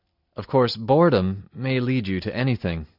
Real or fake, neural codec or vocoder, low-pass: real; none; 5.4 kHz